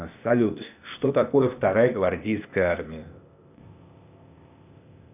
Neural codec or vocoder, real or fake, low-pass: codec, 16 kHz, 0.8 kbps, ZipCodec; fake; 3.6 kHz